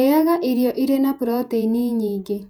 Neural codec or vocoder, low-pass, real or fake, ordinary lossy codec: vocoder, 48 kHz, 128 mel bands, Vocos; 19.8 kHz; fake; none